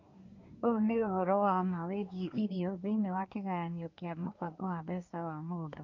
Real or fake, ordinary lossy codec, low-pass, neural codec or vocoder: fake; none; 7.2 kHz; codec, 24 kHz, 1 kbps, SNAC